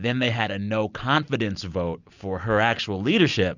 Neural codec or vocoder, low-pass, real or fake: none; 7.2 kHz; real